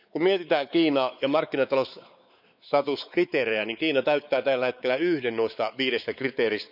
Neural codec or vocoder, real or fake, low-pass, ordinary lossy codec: codec, 16 kHz, 4 kbps, X-Codec, WavLM features, trained on Multilingual LibriSpeech; fake; 5.4 kHz; none